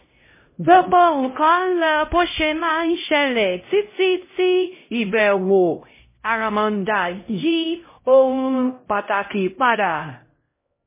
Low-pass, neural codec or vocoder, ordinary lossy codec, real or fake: 3.6 kHz; codec, 16 kHz, 0.5 kbps, X-Codec, HuBERT features, trained on LibriSpeech; MP3, 16 kbps; fake